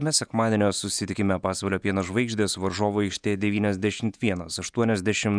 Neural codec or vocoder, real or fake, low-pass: vocoder, 48 kHz, 128 mel bands, Vocos; fake; 9.9 kHz